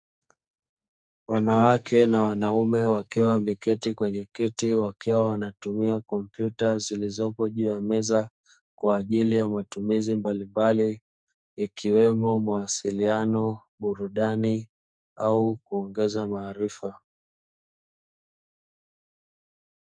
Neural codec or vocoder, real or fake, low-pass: codec, 44.1 kHz, 2.6 kbps, SNAC; fake; 9.9 kHz